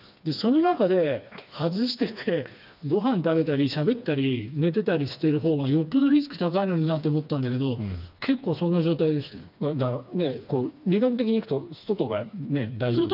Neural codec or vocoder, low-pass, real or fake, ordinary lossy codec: codec, 16 kHz, 2 kbps, FreqCodec, smaller model; 5.4 kHz; fake; none